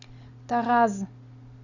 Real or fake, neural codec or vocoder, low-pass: real; none; 7.2 kHz